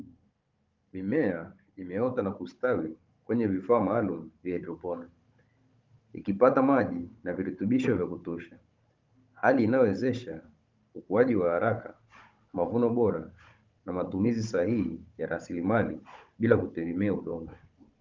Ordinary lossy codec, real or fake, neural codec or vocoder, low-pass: Opus, 24 kbps; fake; codec, 16 kHz, 16 kbps, FunCodec, trained on Chinese and English, 50 frames a second; 7.2 kHz